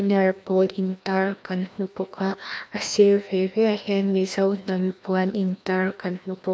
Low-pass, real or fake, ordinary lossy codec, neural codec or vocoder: none; fake; none; codec, 16 kHz, 1 kbps, FreqCodec, larger model